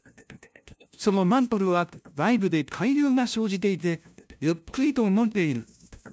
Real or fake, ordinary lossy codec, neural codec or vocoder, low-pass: fake; none; codec, 16 kHz, 0.5 kbps, FunCodec, trained on LibriTTS, 25 frames a second; none